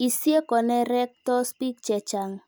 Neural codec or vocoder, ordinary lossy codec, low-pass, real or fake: none; none; none; real